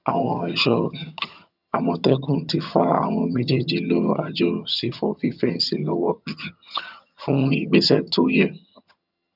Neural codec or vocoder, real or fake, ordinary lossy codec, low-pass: vocoder, 22.05 kHz, 80 mel bands, HiFi-GAN; fake; none; 5.4 kHz